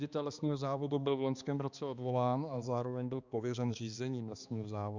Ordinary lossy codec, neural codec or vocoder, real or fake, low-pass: Opus, 64 kbps; codec, 16 kHz, 2 kbps, X-Codec, HuBERT features, trained on balanced general audio; fake; 7.2 kHz